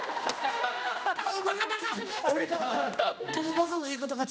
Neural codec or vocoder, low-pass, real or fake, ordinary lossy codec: codec, 16 kHz, 1 kbps, X-Codec, HuBERT features, trained on general audio; none; fake; none